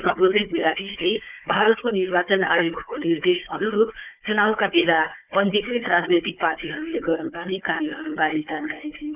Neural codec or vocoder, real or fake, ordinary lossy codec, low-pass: codec, 16 kHz, 4 kbps, FunCodec, trained on Chinese and English, 50 frames a second; fake; none; 3.6 kHz